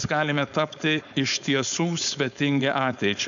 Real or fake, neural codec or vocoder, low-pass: fake; codec, 16 kHz, 4.8 kbps, FACodec; 7.2 kHz